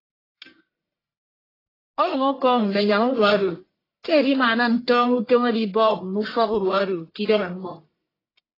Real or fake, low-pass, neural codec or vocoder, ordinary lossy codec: fake; 5.4 kHz; codec, 44.1 kHz, 1.7 kbps, Pupu-Codec; AAC, 24 kbps